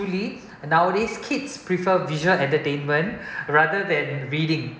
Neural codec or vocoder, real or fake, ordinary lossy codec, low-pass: none; real; none; none